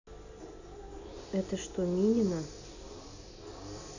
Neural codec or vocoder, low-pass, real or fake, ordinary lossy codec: none; 7.2 kHz; real; none